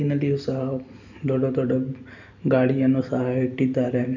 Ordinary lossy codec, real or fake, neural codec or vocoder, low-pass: none; real; none; 7.2 kHz